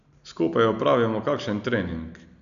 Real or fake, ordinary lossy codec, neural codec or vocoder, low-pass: real; none; none; 7.2 kHz